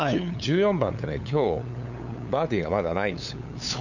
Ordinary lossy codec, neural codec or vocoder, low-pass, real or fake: none; codec, 16 kHz, 8 kbps, FunCodec, trained on LibriTTS, 25 frames a second; 7.2 kHz; fake